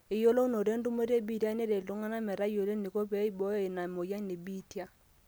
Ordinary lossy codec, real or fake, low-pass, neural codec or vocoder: none; real; none; none